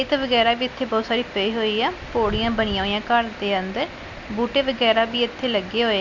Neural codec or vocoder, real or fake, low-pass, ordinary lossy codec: none; real; 7.2 kHz; MP3, 64 kbps